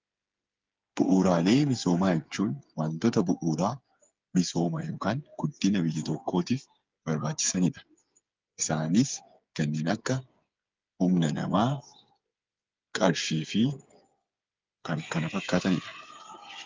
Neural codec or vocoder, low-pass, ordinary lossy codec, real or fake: codec, 16 kHz, 8 kbps, FreqCodec, smaller model; 7.2 kHz; Opus, 24 kbps; fake